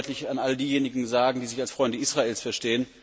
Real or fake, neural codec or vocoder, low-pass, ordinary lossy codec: real; none; none; none